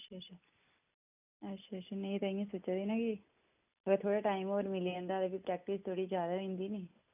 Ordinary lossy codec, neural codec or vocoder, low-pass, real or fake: none; none; 3.6 kHz; real